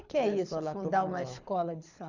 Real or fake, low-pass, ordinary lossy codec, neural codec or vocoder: fake; 7.2 kHz; none; codec, 24 kHz, 6 kbps, HILCodec